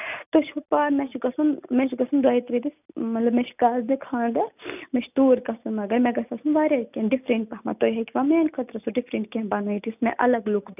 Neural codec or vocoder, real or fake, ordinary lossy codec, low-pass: none; real; none; 3.6 kHz